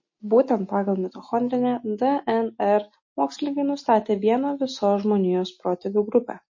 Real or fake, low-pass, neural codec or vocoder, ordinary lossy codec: real; 7.2 kHz; none; MP3, 32 kbps